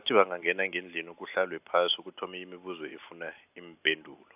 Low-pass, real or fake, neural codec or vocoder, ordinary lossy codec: 3.6 kHz; real; none; AAC, 32 kbps